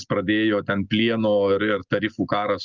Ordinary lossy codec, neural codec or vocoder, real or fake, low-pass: Opus, 24 kbps; none; real; 7.2 kHz